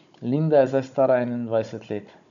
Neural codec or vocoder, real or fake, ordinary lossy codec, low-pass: codec, 16 kHz, 16 kbps, FunCodec, trained on Chinese and English, 50 frames a second; fake; MP3, 96 kbps; 7.2 kHz